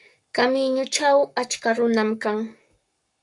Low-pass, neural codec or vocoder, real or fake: 10.8 kHz; codec, 44.1 kHz, 7.8 kbps, Pupu-Codec; fake